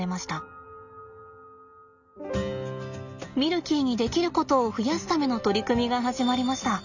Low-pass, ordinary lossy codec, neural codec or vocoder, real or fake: 7.2 kHz; none; none; real